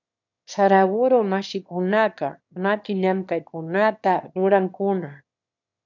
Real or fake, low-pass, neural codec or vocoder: fake; 7.2 kHz; autoencoder, 22.05 kHz, a latent of 192 numbers a frame, VITS, trained on one speaker